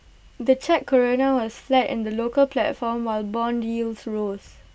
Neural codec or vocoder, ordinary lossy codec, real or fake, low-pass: none; none; real; none